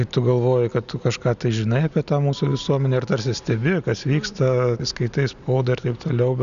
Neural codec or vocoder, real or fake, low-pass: none; real; 7.2 kHz